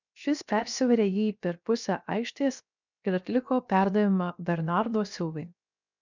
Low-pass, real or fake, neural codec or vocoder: 7.2 kHz; fake; codec, 16 kHz, 0.7 kbps, FocalCodec